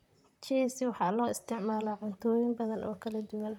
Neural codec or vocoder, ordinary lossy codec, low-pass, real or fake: vocoder, 44.1 kHz, 128 mel bands, Pupu-Vocoder; none; 19.8 kHz; fake